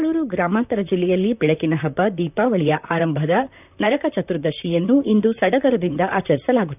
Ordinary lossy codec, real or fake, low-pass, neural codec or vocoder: none; fake; 3.6 kHz; vocoder, 44.1 kHz, 128 mel bands, Pupu-Vocoder